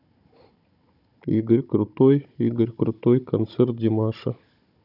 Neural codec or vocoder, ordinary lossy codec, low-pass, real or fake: codec, 16 kHz, 16 kbps, FunCodec, trained on Chinese and English, 50 frames a second; none; 5.4 kHz; fake